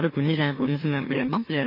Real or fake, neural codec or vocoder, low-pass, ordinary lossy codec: fake; autoencoder, 44.1 kHz, a latent of 192 numbers a frame, MeloTTS; 5.4 kHz; MP3, 24 kbps